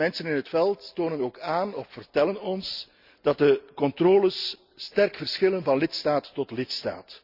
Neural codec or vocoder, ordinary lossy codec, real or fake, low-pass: none; Opus, 64 kbps; real; 5.4 kHz